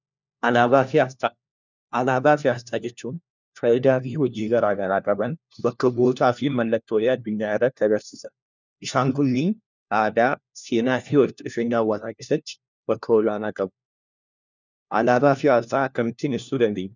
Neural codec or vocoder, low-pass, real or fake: codec, 16 kHz, 1 kbps, FunCodec, trained on LibriTTS, 50 frames a second; 7.2 kHz; fake